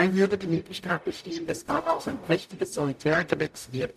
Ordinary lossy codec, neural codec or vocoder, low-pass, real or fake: none; codec, 44.1 kHz, 0.9 kbps, DAC; 14.4 kHz; fake